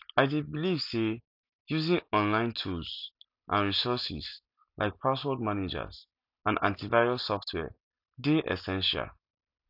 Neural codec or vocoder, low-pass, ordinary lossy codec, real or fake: none; 5.4 kHz; none; real